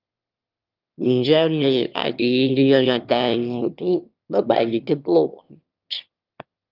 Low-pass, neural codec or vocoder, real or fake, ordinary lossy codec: 5.4 kHz; autoencoder, 22.05 kHz, a latent of 192 numbers a frame, VITS, trained on one speaker; fake; Opus, 24 kbps